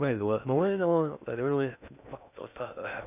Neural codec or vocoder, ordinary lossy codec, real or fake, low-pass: codec, 16 kHz in and 24 kHz out, 0.6 kbps, FocalCodec, streaming, 2048 codes; none; fake; 3.6 kHz